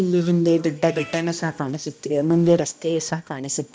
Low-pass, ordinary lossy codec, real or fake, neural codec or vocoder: none; none; fake; codec, 16 kHz, 1 kbps, X-Codec, HuBERT features, trained on balanced general audio